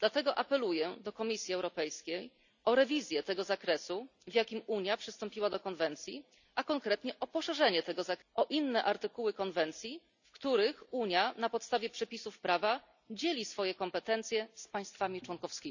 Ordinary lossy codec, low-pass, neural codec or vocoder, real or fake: none; 7.2 kHz; none; real